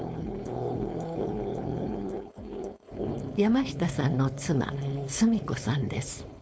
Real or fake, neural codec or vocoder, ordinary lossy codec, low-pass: fake; codec, 16 kHz, 4.8 kbps, FACodec; none; none